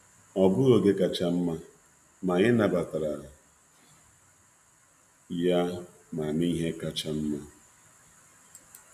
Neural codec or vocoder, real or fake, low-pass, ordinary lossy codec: none; real; 14.4 kHz; AAC, 96 kbps